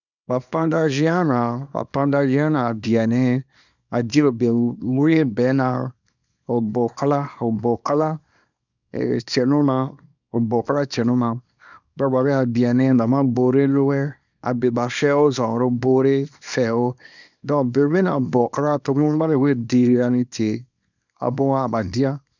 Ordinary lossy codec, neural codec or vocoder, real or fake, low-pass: none; codec, 24 kHz, 0.9 kbps, WavTokenizer, small release; fake; 7.2 kHz